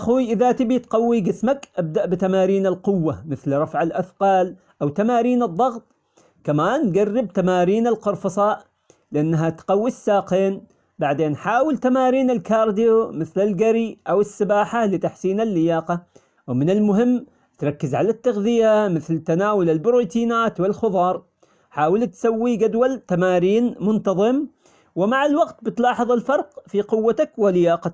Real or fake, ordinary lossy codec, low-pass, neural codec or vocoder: real; none; none; none